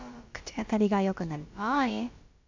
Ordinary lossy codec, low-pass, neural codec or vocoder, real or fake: MP3, 64 kbps; 7.2 kHz; codec, 16 kHz, about 1 kbps, DyCAST, with the encoder's durations; fake